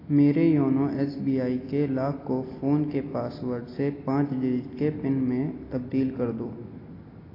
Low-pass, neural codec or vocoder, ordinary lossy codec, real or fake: 5.4 kHz; none; AAC, 24 kbps; real